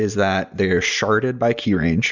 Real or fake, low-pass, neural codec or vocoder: real; 7.2 kHz; none